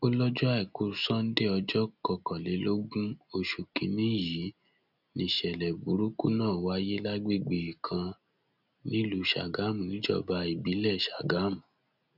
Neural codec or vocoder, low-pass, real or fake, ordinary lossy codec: none; 5.4 kHz; real; none